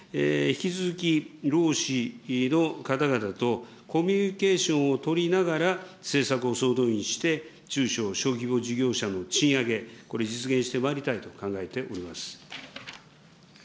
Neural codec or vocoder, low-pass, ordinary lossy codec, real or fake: none; none; none; real